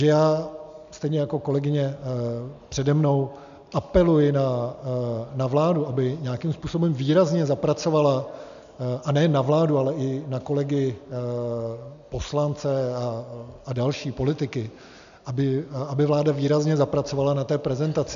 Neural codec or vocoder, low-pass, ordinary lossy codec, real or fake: none; 7.2 kHz; AAC, 96 kbps; real